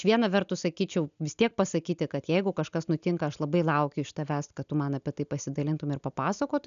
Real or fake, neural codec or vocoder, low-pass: real; none; 7.2 kHz